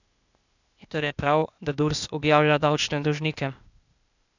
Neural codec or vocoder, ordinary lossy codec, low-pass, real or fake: codec, 16 kHz, 0.8 kbps, ZipCodec; none; 7.2 kHz; fake